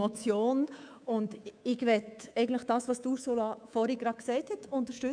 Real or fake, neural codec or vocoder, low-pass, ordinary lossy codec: fake; codec, 24 kHz, 3.1 kbps, DualCodec; 9.9 kHz; none